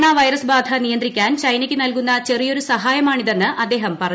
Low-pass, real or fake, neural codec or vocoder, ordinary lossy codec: none; real; none; none